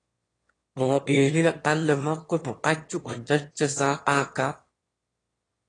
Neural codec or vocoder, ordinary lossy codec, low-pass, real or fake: autoencoder, 22.05 kHz, a latent of 192 numbers a frame, VITS, trained on one speaker; AAC, 32 kbps; 9.9 kHz; fake